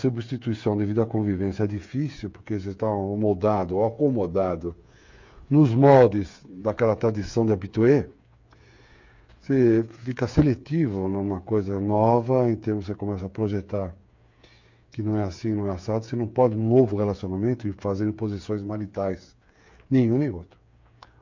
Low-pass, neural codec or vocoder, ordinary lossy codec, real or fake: 7.2 kHz; codec, 16 kHz, 8 kbps, FreqCodec, smaller model; MP3, 48 kbps; fake